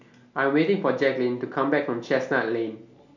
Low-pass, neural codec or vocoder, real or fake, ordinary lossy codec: 7.2 kHz; none; real; none